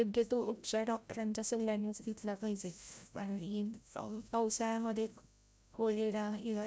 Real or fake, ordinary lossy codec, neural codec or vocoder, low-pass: fake; none; codec, 16 kHz, 0.5 kbps, FreqCodec, larger model; none